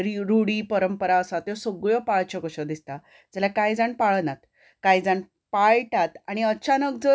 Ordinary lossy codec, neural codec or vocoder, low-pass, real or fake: none; none; none; real